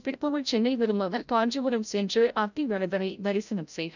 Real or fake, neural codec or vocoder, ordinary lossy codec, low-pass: fake; codec, 16 kHz, 0.5 kbps, FreqCodec, larger model; none; 7.2 kHz